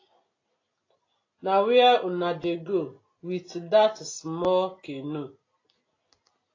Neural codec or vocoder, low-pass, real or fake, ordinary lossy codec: none; 7.2 kHz; real; AAC, 32 kbps